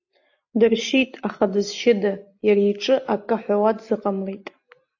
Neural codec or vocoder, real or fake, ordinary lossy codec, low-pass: none; real; AAC, 48 kbps; 7.2 kHz